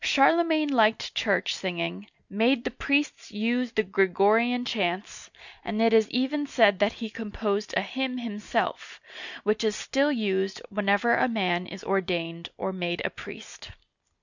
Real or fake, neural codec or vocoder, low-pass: real; none; 7.2 kHz